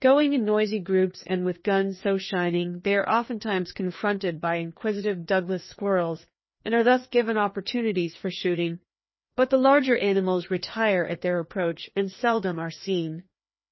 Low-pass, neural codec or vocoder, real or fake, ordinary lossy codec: 7.2 kHz; codec, 16 kHz, 2 kbps, FreqCodec, larger model; fake; MP3, 24 kbps